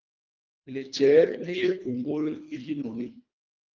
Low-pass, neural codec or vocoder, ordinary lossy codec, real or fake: 7.2 kHz; codec, 24 kHz, 1.5 kbps, HILCodec; Opus, 24 kbps; fake